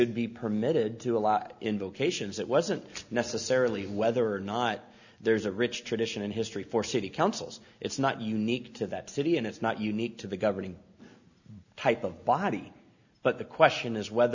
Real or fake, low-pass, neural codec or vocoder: real; 7.2 kHz; none